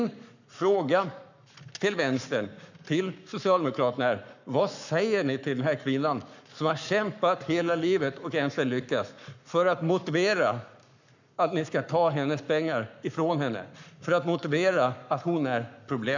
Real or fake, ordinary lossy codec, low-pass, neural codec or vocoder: fake; none; 7.2 kHz; codec, 44.1 kHz, 7.8 kbps, Pupu-Codec